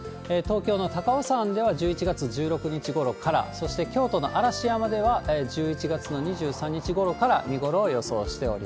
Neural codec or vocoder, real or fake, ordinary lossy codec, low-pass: none; real; none; none